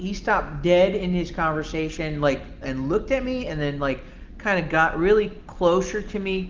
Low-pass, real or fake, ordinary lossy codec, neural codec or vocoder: 7.2 kHz; real; Opus, 32 kbps; none